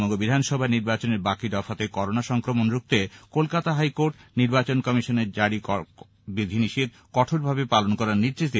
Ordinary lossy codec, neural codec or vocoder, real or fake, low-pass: none; none; real; none